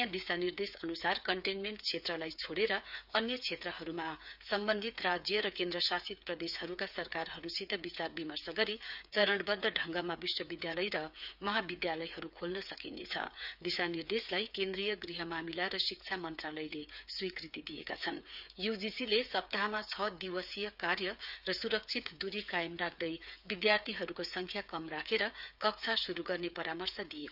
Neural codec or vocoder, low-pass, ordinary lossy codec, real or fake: codec, 16 kHz, 8 kbps, FreqCodec, smaller model; 5.4 kHz; none; fake